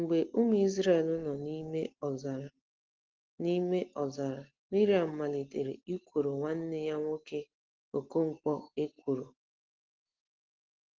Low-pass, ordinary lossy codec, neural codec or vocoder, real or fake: 7.2 kHz; Opus, 16 kbps; none; real